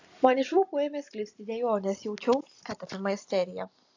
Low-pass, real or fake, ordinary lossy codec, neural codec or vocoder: 7.2 kHz; fake; AAC, 48 kbps; vocoder, 44.1 kHz, 128 mel bands every 256 samples, BigVGAN v2